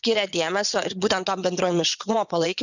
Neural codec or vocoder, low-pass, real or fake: vocoder, 22.05 kHz, 80 mel bands, WaveNeXt; 7.2 kHz; fake